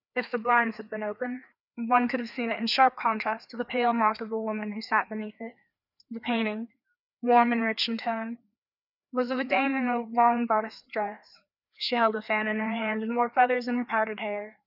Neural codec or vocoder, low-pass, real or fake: codec, 16 kHz, 2 kbps, FreqCodec, larger model; 5.4 kHz; fake